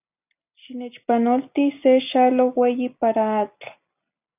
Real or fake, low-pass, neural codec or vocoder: real; 3.6 kHz; none